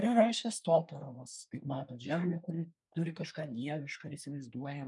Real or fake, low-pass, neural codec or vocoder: fake; 10.8 kHz; codec, 24 kHz, 1 kbps, SNAC